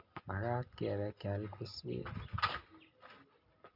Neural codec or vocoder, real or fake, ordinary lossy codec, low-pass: none; real; none; 5.4 kHz